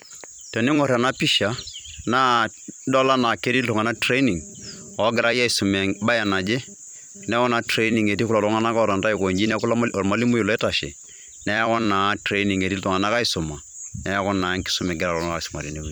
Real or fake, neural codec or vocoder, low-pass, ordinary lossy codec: fake; vocoder, 44.1 kHz, 128 mel bands every 256 samples, BigVGAN v2; none; none